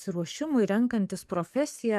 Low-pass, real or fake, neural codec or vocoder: 14.4 kHz; fake; codec, 44.1 kHz, 7.8 kbps, DAC